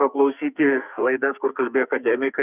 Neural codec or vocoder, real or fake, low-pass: codec, 44.1 kHz, 2.6 kbps, SNAC; fake; 3.6 kHz